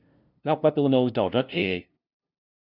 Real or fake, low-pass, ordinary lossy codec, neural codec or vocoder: fake; 5.4 kHz; none; codec, 16 kHz, 0.5 kbps, FunCodec, trained on LibriTTS, 25 frames a second